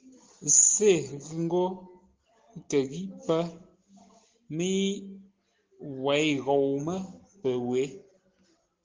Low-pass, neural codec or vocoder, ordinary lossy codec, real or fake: 7.2 kHz; none; Opus, 16 kbps; real